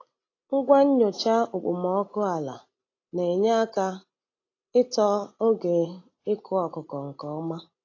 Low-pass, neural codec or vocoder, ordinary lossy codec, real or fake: 7.2 kHz; none; AAC, 32 kbps; real